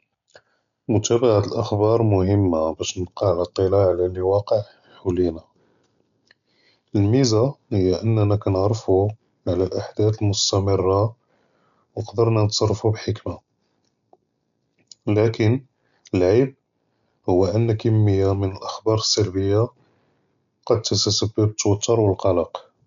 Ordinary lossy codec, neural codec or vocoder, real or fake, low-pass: MP3, 96 kbps; none; real; 7.2 kHz